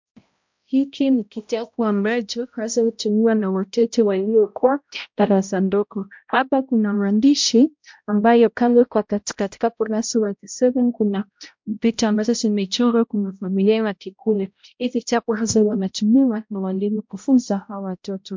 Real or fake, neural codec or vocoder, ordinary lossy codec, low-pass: fake; codec, 16 kHz, 0.5 kbps, X-Codec, HuBERT features, trained on balanced general audio; MP3, 64 kbps; 7.2 kHz